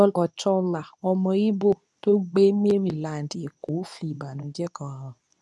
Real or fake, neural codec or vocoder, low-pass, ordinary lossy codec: fake; codec, 24 kHz, 0.9 kbps, WavTokenizer, medium speech release version 2; none; none